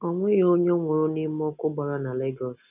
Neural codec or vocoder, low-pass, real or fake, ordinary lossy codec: none; 3.6 kHz; real; none